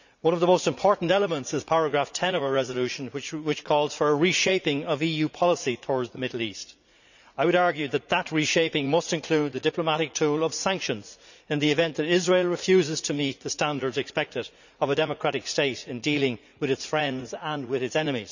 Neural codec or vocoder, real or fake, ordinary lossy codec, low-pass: vocoder, 44.1 kHz, 80 mel bands, Vocos; fake; none; 7.2 kHz